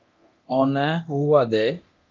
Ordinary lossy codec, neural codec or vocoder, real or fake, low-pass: Opus, 24 kbps; codec, 24 kHz, 0.9 kbps, DualCodec; fake; 7.2 kHz